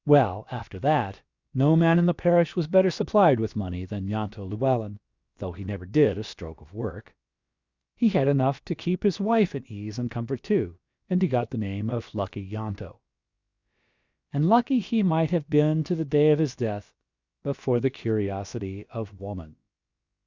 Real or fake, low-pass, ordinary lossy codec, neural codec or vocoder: fake; 7.2 kHz; Opus, 64 kbps; codec, 16 kHz, about 1 kbps, DyCAST, with the encoder's durations